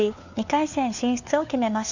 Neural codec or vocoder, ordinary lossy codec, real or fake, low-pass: codec, 16 kHz, 4 kbps, FunCodec, trained on LibriTTS, 50 frames a second; none; fake; 7.2 kHz